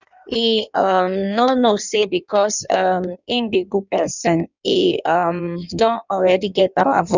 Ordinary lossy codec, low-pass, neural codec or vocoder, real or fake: none; 7.2 kHz; codec, 16 kHz in and 24 kHz out, 1.1 kbps, FireRedTTS-2 codec; fake